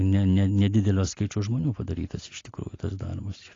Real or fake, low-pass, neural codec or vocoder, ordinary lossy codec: real; 7.2 kHz; none; AAC, 32 kbps